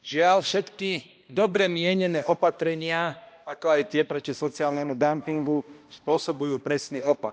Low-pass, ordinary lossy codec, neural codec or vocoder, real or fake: none; none; codec, 16 kHz, 1 kbps, X-Codec, HuBERT features, trained on balanced general audio; fake